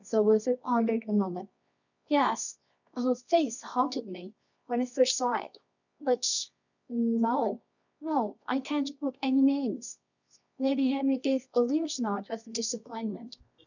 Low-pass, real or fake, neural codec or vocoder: 7.2 kHz; fake; codec, 24 kHz, 0.9 kbps, WavTokenizer, medium music audio release